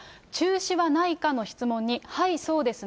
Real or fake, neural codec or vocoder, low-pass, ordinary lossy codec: real; none; none; none